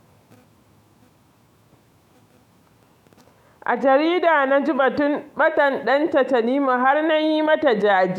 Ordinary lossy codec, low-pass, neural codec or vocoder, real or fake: none; 19.8 kHz; autoencoder, 48 kHz, 128 numbers a frame, DAC-VAE, trained on Japanese speech; fake